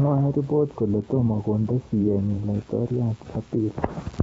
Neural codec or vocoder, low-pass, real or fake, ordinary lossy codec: vocoder, 44.1 kHz, 128 mel bands every 512 samples, BigVGAN v2; 19.8 kHz; fake; AAC, 24 kbps